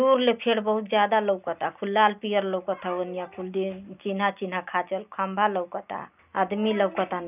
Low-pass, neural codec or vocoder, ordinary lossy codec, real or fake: 3.6 kHz; none; none; real